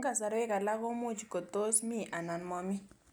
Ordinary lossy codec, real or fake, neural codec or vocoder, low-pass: none; real; none; none